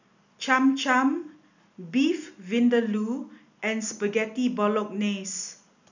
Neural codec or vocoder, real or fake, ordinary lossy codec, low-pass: none; real; none; 7.2 kHz